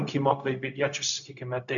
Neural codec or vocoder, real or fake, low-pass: codec, 16 kHz, 0.4 kbps, LongCat-Audio-Codec; fake; 7.2 kHz